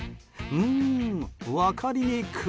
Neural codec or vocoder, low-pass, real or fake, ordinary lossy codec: none; none; real; none